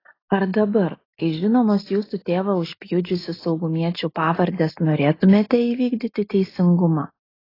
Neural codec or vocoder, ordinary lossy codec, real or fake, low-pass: none; AAC, 24 kbps; real; 5.4 kHz